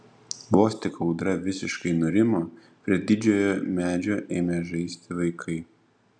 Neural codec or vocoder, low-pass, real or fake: none; 9.9 kHz; real